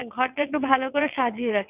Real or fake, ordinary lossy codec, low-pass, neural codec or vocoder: fake; none; 3.6 kHz; vocoder, 22.05 kHz, 80 mel bands, WaveNeXt